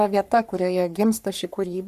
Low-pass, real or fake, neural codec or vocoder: 14.4 kHz; fake; codec, 44.1 kHz, 3.4 kbps, Pupu-Codec